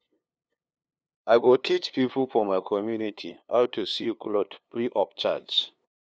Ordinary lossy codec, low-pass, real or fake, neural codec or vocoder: none; none; fake; codec, 16 kHz, 2 kbps, FunCodec, trained on LibriTTS, 25 frames a second